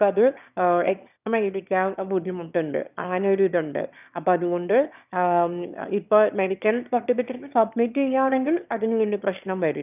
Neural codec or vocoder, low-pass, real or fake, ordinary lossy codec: autoencoder, 22.05 kHz, a latent of 192 numbers a frame, VITS, trained on one speaker; 3.6 kHz; fake; none